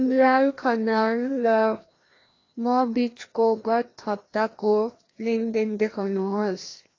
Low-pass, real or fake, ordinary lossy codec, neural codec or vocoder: 7.2 kHz; fake; AAC, 32 kbps; codec, 16 kHz, 1 kbps, FreqCodec, larger model